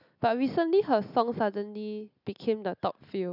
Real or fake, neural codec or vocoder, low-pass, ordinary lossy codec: real; none; 5.4 kHz; none